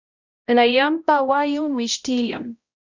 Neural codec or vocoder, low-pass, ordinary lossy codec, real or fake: codec, 16 kHz, 0.5 kbps, X-Codec, HuBERT features, trained on balanced general audio; 7.2 kHz; Opus, 64 kbps; fake